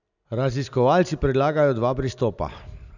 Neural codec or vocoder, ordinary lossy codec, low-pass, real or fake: none; none; 7.2 kHz; real